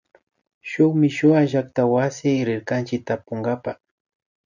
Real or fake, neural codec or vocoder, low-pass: real; none; 7.2 kHz